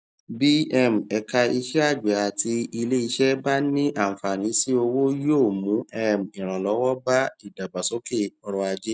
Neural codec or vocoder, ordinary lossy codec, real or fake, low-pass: none; none; real; none